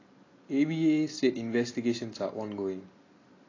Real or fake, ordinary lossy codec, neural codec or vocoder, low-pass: real; AAC, 32 kbps; none; 7.2 kHz